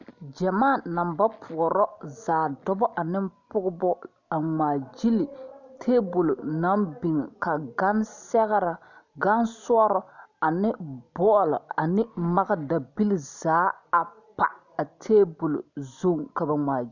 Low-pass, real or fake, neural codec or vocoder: 7.2 kHz; real; none